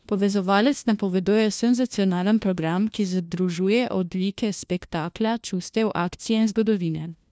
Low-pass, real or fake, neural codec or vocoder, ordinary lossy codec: none; fake; codec, 16 kHz, 1 kbps, FunCodec, trained on LibriTTS, 50 frames a second; none